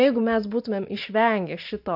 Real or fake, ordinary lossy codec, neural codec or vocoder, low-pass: real; AAC, 48 kbps; none; 5.4 kHz